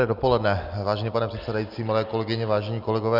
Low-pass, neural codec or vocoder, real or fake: 5.4 kHz; none; real